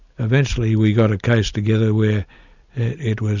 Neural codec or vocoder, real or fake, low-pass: none; real; 7.2 kHz